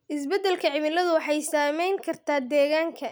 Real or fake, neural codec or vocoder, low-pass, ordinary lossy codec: real; none; none; none